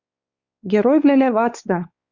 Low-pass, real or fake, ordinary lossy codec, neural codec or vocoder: 7.2 kHz; fake; Opus, 64 kbps; codec, 16 kHz, 4 kbps, X-Codec, WavLM features, trained on Multilingual LibriSpeech